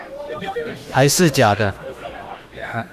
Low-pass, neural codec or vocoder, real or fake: 14.4 kHz; autoencoder, 48 kHz, 32 numbers a frame, DAC-VAE, trained on Japanese speech; fake